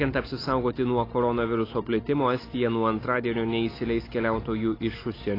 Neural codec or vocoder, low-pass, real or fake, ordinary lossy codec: none; 5.4 kHz; real; AAC, 24 kbps